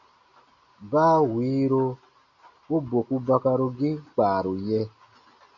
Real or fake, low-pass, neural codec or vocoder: real; 7.2 kHz; none